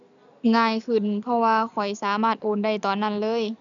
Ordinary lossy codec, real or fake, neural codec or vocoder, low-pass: none; real; none; 7.2 kHz